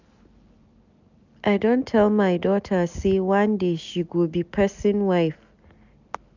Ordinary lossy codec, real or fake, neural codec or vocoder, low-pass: none; real; none; 7.2 kHz